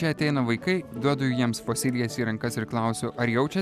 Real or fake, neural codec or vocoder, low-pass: real; none; 14.4 kHz